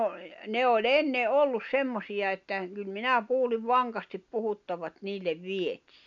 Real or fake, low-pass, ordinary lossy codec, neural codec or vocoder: real; 7.2 kHz; none; none